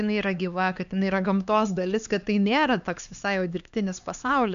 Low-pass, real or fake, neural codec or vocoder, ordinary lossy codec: 7.2 kHz; fake; codec, 16 kHz, 2 kbps, X-Codec, HuBERT features, trained on LibriSpeech; AAC, 96 kbps